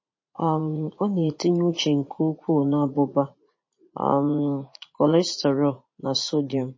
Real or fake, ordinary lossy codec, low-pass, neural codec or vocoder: fake; MP3, 32 kbps; 7.2 kHz; vocoder, 44.1 kHz, 80 mel bands, Vocos